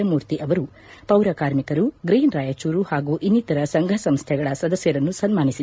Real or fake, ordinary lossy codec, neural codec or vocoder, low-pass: real; none; none; none